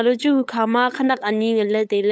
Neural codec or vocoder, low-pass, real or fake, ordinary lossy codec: codec, 16 kHz, 8 kbps, FunCodec, trained on LibriTTS, 25 frames a second; none; fake; none